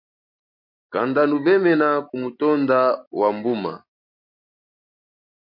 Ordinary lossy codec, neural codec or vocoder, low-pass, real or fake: MP3, 32 kbps; none; 5.4 kHz; real